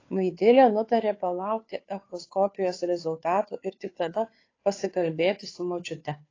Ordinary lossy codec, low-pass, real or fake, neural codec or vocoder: AAC, 32 kbps; 7.2 kHz; fake; codec, 16 kHz, 2 kbps, FunCodec, trained on Chinese and English, 25 frames a second